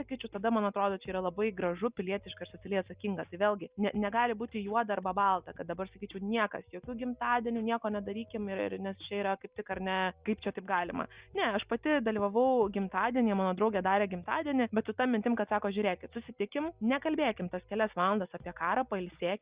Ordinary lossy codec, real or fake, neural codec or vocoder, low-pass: Opus, 64 kbps; real; none; 3.6 kHz